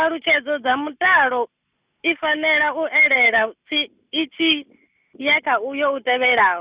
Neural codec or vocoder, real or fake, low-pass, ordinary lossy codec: none; real; 3.6 kHz; Opus, 64 kbps